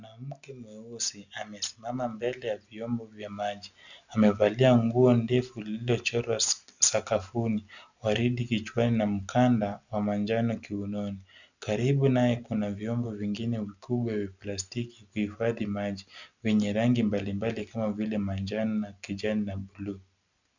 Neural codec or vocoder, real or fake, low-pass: none; real; 7.2 kHz